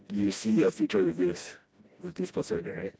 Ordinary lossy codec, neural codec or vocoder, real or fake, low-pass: none; codec, 16 kHz, 1 kbps, FreqCodec, smaller model; fake; none